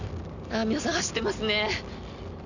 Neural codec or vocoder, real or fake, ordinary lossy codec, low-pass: none; real; none; 7.2 kHz